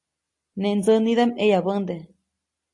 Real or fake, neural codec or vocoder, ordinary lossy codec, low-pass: real; none; AAC, 48 kbps; 10.8 kHz